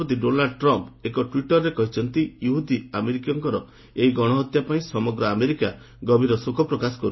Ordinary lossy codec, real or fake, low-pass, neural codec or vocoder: MP3, 24 kbps; real; 7.2 kHz; none